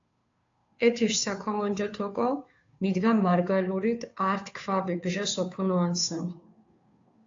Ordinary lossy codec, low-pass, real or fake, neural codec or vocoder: AAC, 48 kbps; 7.2 kHz; fake; codec, 16 kHz, 2 kbps, FunCodec, trained on Chinese and English, 25 frames a second